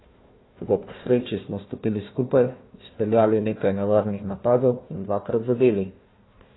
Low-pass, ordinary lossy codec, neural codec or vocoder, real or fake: 7.2 kHz; AAC, 16 kbps; codec, 16 kHz, 1 kbps, FunCodec, trained on Chinese and English, 50 frames a second; fake